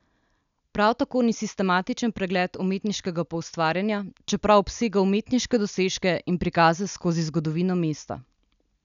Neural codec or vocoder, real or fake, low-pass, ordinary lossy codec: none; real; 7.2 kHz; none